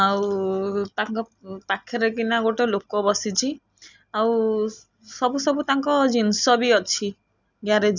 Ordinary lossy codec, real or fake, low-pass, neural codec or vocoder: none; real; 7.2 kHz; none